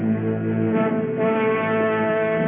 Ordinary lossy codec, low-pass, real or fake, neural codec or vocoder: MP3, 32 kbps; 3.6 kHz; real; none